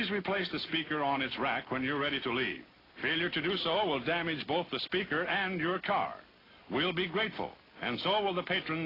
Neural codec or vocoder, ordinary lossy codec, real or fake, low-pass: none; AAC, 24 kbps; real; 5.4 kHz